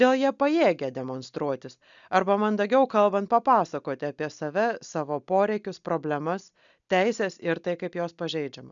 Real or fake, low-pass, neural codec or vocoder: real; 7.2 kHz; none